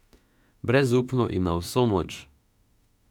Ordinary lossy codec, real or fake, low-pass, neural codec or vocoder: none; fake; 19.8 kHz; autoencoder, 48 kHz, 32 numbers a frame, DAC-VAE, trained on Japanese speech